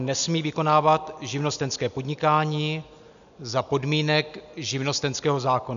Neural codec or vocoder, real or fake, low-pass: none; real; 7.2 kHz